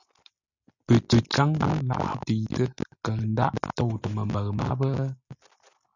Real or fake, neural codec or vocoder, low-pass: real; none; 7.2 kHz